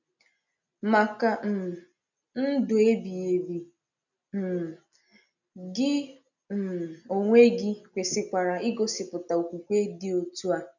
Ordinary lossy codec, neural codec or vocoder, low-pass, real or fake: none; none; 7.2 kHz; real